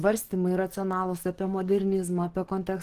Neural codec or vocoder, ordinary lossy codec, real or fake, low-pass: codec, 44.1 kHz, 7.8 kbps, DAC; Opus, 16 kbps; fake; 14.4 kHz